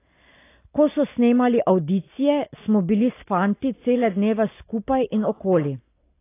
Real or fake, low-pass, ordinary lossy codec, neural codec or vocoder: real; 3.6 kHz; AAC, 24 kbps; none